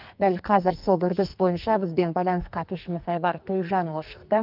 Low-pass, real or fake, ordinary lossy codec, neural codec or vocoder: 5.4 kHz; fake; Opus, 24 kbps; codec, 44.1 kHz, 2.6 kbps, SNAC